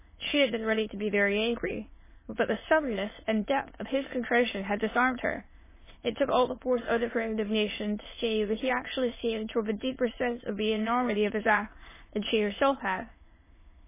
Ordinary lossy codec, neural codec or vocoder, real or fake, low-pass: MP3, 16 kbps; autoencoder, 22.05 kHz, a latent of 192 numbers a frame, VITS, trained on many speakers; fake; 3.6 kHz